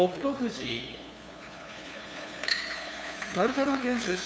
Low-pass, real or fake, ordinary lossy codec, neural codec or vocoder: none; fake; none; codec, 16 kHz, 2 kbps, FunCodec, trained on LibriTTS, 25 frames a second